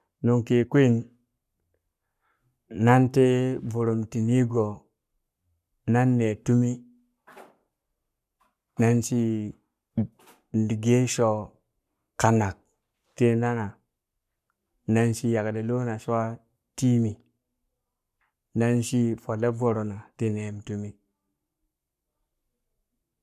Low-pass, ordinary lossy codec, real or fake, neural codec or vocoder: 14.4 kHz; none; fake; codec, 44.1 kHz, 7.8 kbps, Pupu-Codec